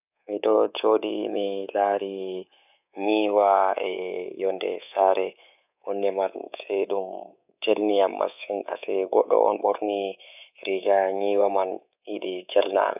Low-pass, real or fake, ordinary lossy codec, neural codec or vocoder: 3.6 kHz; fake; none; codec, 24 kHz, 3.1 kbps, DualCodec